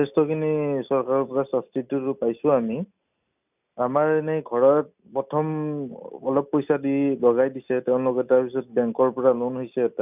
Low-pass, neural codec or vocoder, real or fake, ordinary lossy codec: 3.6 kHz; none; real; none